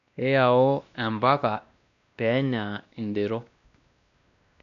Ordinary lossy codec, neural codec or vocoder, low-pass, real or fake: none; codec, 16 kHz, 1 kbps, X-Codec, WavLM features, trained on Multilingual LibriSpeech; 7.2 kHz; fake